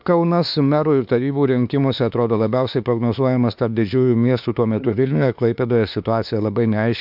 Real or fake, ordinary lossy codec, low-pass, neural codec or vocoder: fake; MP3, 48 kbps; 5.4 kHz; autoencoder, 48 kHz, 32 numbers a frame, DAC-VAE, trained on Japanese speech